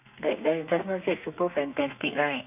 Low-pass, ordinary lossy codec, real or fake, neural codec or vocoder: 3.6 kHz; none; fake; codec, 32 kHz, 1.9 kbps, SNAC